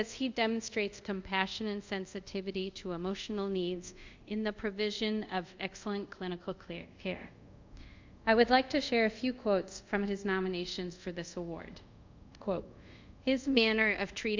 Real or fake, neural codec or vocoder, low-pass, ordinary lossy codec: fake; codec, 24 kHz, 0.5 kbps, DualCodec; 7.2 kHz; MP3, 64 kbps